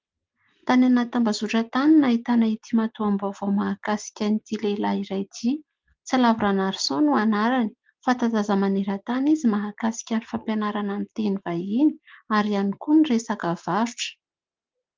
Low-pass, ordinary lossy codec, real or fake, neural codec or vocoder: 7.2 kHz; Opus, 32 kbps; real; none